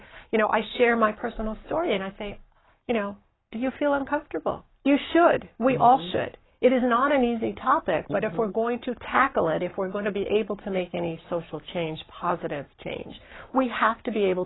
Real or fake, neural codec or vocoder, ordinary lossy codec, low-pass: fake; codec, 44.1 kHz, 7.8 kbps, Pupu-Codec; AAC, 16 kbps; 7.2 kHz